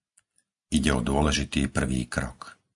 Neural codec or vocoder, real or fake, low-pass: none; real; 10.8 kHz